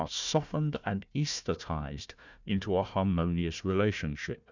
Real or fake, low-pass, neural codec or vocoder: fake; 7.2 kHz; codec, 16 kHz, 1 kbps, FunCodec, trained on Chinese and English, 50 frames a second